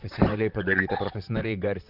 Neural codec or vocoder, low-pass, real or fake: vocoder, 44.1 kHz, 128 mel bands, Pupu-Vocoder; 5.4 kHz; fake